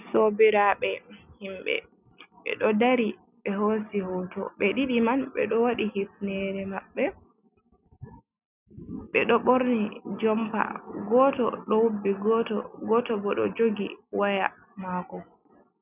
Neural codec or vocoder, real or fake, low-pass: none; real; 3.6 kHz